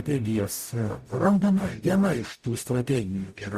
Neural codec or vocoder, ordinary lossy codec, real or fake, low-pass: codec, 44.1 kHz, 0.9 kbps, DAC; Opus, 64 kbps; fake; 14.4 kHz